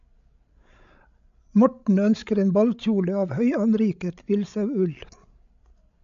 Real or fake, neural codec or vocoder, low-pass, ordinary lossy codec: fake; codec, 16 kHz, 16 kbps, FreqCodec, larger model; 7.2 kHz; AAC, 64 kbps